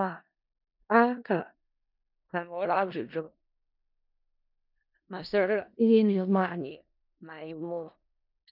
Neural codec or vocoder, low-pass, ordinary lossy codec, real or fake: codec, 16 kHz in and 24 kHz out, 0.4 kbps, LongCat-Audio-Codec, four codebook decoder; 5.4 kHz; none; fake